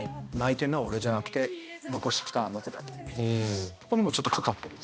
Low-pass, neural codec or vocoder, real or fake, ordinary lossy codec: none; codec, 16 kHz, 1 kbps, X-Codec, HuBERT features, trained on balanced general audio; fake; none